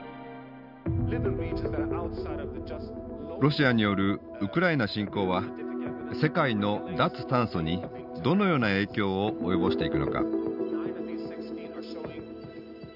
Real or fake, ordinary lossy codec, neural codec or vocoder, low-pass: real; none; none; 5.4 kHz